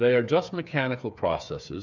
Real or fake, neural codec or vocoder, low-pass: fake; codec, 16 kHz, 8 kbps, FreqCodec, smaller model; 7.2 kHz